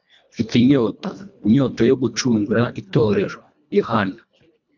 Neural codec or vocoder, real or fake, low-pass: codec, 24 kHz, 1.5 kbps, HILCodec; fake; 7.2 kHz